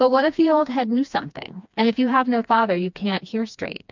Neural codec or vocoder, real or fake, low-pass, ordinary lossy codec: codec, 16 kHz, 2 kbps, FreqCodec, smaller model; fake; 7.2 kHz; MP3, 64 kbps